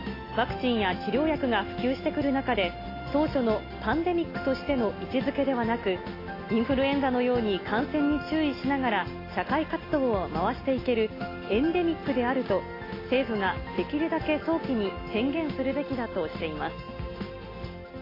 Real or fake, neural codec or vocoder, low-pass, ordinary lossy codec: real; none; 5.4 kHz; AAC, 24 kbps